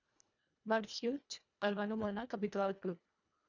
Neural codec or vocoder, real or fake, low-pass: codec, 24 kHz, 1.5 kbps, HILCodec; fake; 7.2 kHz